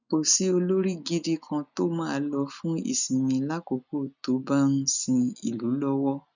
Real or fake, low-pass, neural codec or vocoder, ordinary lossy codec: real; 7.2 kHz; none; none